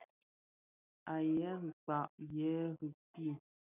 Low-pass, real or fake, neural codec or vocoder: 3.6 kHz; real; none